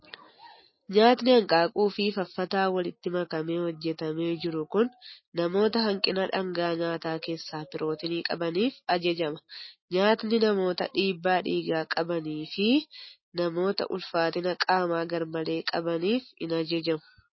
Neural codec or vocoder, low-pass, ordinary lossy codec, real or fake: none; 7.2 kHz; MP3, 24 kbps; real